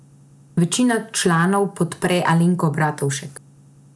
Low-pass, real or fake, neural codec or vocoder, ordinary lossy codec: none; real; none; none